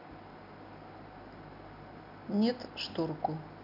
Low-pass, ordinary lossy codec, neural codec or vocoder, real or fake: 5.4 kHz; none; none; real